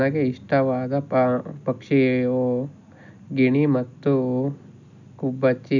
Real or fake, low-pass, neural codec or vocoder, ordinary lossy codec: real; 7.2 kHz; none; none